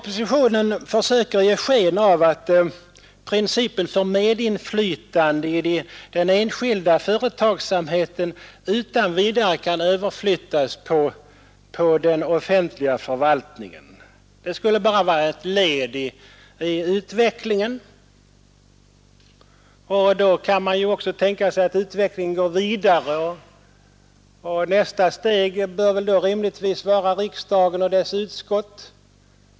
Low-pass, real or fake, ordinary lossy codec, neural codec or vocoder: none; real; none; none